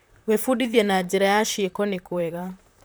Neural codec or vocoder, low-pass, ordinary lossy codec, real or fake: vocoder, 44.1 kHz, 128 mel bands, Pupu-Vocoder; none; none; fake